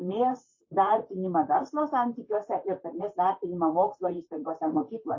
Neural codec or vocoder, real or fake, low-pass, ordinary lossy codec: vocoder, 44.1 kHz, 128 mel bands, Pupu-Vocoder; fake; 7.2 kHz; MP3, 32 kbps